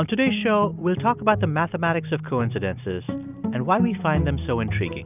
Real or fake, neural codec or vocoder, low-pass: real; none; 3.6 kHz